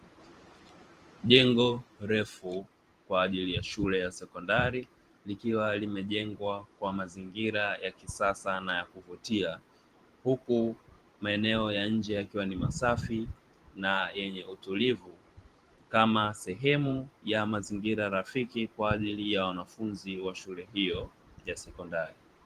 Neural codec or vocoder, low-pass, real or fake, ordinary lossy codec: vocoder, 44.1 kHz, 128 mel bands every 512 samples, BigVGAN v2; 14.4 kHz; fake; Opus, 24 kbps